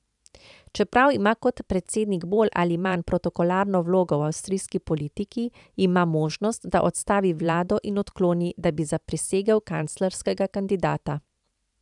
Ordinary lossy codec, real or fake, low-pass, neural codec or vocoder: none; fake; 10.8 kHz; vocoder, 44.1 kHz, 128 mel bands every 512 samples, BigVGAN v2